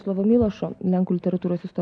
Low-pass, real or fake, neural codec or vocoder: 9.9 kHz; real; none